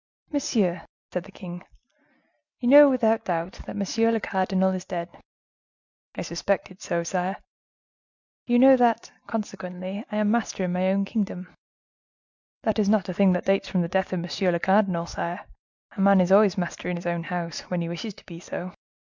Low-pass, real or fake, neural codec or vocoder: 7.2 kHz; real; none